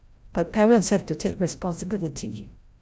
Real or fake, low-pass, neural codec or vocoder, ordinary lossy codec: fake; none; codec, 16 kHz, 0.5 kbps, FreqCodec, larger model; none